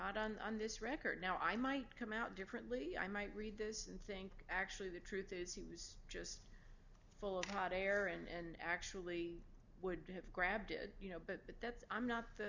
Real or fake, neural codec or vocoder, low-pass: real; none; 7.2 kHz